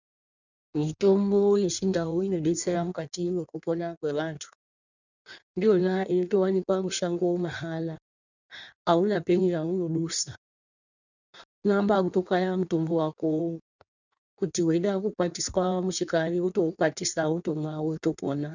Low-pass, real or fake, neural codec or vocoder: 7.2 kHz; fake; codec, 16 kHz in and 24 kHz out, 1.1 kbps, FireRedTTS-2 codec